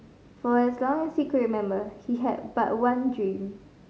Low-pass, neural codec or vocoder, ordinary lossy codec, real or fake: none; none; none; real